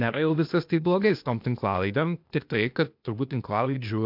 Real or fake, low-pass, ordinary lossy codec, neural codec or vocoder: fake; 5.4 kHz; MP3, 48 kbps; codec, 16 kHz, 0.8 kbps, ZipCodec